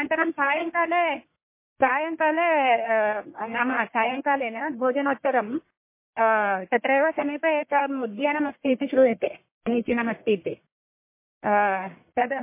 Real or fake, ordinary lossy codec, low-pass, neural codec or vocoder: fake; MP3, 24 kbps; 3.6 kHz; codec, 44.1 kHz, 1.7 kbps, Pupu-Codec